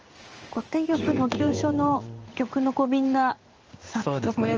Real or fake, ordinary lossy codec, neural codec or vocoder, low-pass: fake; Opus, 24 kbps; codec, 16 kHz in and 24 kHz out, 1 kbps, XY-Tokenizer; 7.2 kHz